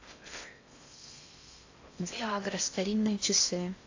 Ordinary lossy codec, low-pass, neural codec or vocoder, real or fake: AAC, 48 kbps; 7.2 kHz; codec, 16 kHz in and 24 kHz out, 0.6 kbps, FocalCodec, streaming, 4096 codes; fake